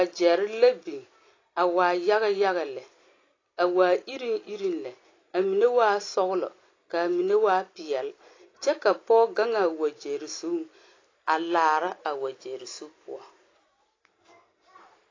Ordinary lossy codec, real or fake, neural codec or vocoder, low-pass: AAC, 32 kbps; real; none; 7.2 kHz